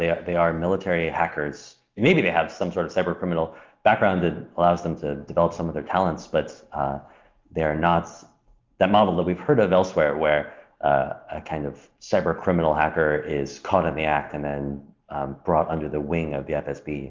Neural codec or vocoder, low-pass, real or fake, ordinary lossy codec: none; 7.2 kHz; real; Opus, 24 kbps